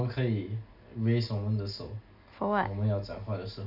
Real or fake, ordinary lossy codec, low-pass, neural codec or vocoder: real; none; 5.4 kHz; none